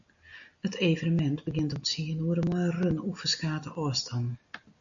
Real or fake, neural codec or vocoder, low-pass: real; none; 7.2 kHz